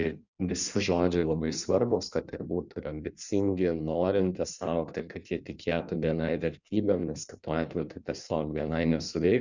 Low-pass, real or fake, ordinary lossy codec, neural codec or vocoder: 7.2 kHz; fake; Opus, 64 kbps; codec, 16 kHz in and 24 kHz out, 1.1 kbps, FireRedTTS-2 codec